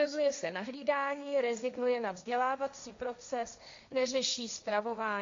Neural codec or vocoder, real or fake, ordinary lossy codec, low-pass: codec, 16 kHz, 1.1 kbps, Voila-Tokenizer; fake; MP3, 48 kbps; 7.2 kHz